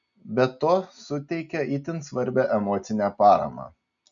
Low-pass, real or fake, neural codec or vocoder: 7.2 kHz; real; none